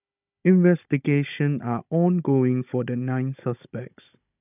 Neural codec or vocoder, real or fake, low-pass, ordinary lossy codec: codec, 16 kHz, 16 kbps, FunCodec, trained on Chinese and English, 50 frames a second; fake; 3.6 kHz; none